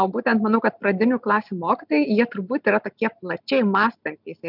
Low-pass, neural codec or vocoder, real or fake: 5.4 kHz; none; real